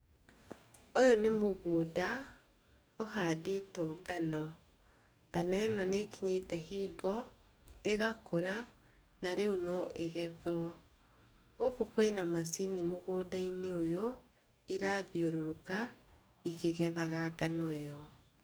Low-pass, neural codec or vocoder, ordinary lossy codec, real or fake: none; codec, 44.1 kHz, 2.6 kbps, DAC; none; fake